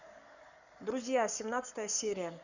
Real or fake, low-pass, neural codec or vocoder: fake; 7.2 kHz; codec, 44.1 kHz, 7.8 kbps, Pupu-Codec